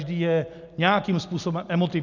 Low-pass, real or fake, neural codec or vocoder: 7.2 kHz; real; none